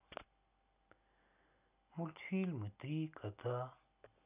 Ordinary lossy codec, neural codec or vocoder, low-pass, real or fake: none; none; 3.6 kHz; real